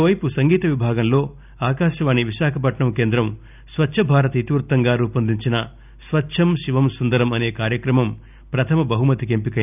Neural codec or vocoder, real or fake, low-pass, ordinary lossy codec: none; real; 3.6 kHz; none